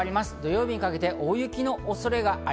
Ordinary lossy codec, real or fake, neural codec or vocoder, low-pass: none; real; none; none